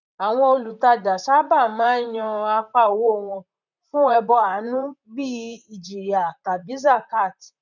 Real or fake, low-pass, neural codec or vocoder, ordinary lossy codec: fake; 7.2 kHz; vocoder, 44.1 kHz, 128 mel bands, Pupu-Vocoder; none